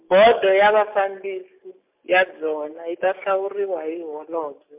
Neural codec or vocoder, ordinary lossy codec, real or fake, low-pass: none; MP3, 32 kbps; real; 3.6 kHz